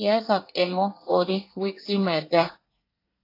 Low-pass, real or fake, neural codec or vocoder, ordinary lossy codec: 5.4 kHz; fake; codec, 24 kHz, 1 kbps, SNAC; AAC, 32 kbps